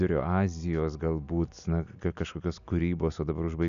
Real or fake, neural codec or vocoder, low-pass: real; none; 7.2 kHz